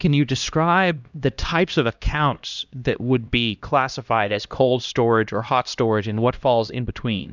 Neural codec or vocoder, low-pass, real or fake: codec, 16 kHz, 1 kbps, X-Codec, HuBERT features, trained on LibriSpeech; 7.2 kHz; fake